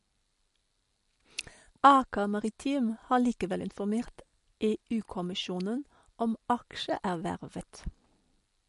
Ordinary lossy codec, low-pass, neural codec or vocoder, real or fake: MP3, 48 kbps; 14.4 kHz; none; real